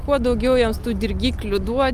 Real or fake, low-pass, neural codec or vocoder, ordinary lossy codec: real; 14.4 kHz; none; Opus, 32 kbps